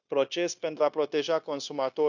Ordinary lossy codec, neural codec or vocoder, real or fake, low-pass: none; codec, 16 kHz, 0.9 kbps, LongCat-Audio-Codec; fake; 7.2 kHz